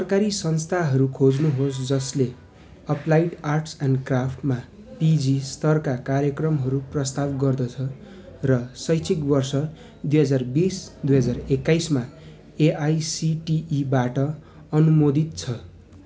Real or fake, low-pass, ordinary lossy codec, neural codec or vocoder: real; none; none; none